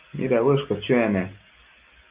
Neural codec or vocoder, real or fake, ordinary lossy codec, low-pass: none; real; Opus, 32 kbps; 3.6 kHz